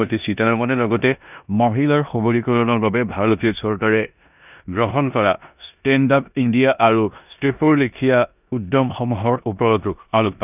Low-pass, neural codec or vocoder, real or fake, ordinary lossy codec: 3.6 kHz; codec, 16 kHz in and 24 kHz out, 0.9 kbps, LongCat-Audio-Codec, four codebook decoder; fake; none